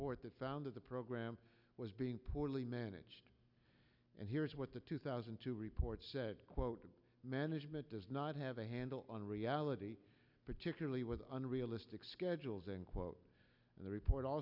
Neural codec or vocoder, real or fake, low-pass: none; real; 5.4 kHz